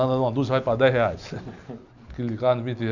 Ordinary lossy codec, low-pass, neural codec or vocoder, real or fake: none; 7.2 kHz; none; real